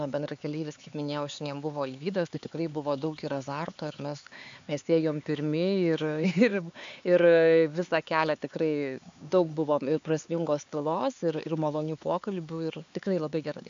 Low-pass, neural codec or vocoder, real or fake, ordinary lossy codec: 7.2 kHz; codec, 16 kHz, 4 kbps, X-Codec, HuBERT features, trained on LibriSpeech; fake; AAC, 64 kbps